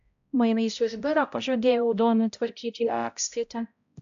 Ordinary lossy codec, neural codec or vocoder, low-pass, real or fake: AAC, 64 kbps; codec, 16 kHz, 0.5 kbps, X-Codec, HuBERT features, trained on balanced general audio; 7.2 kHz; fake